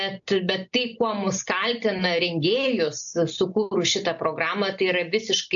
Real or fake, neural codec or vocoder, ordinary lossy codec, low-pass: real; none; AAC, 64 kbps; 7.2 kHz